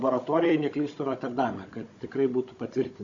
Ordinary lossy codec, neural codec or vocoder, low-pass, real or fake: Opus, 64 kbps; codec, 16 kHz, 16 kbps, FunCodec, trained on Chinese and English, 50 frames a second; 7.2 kHz; fake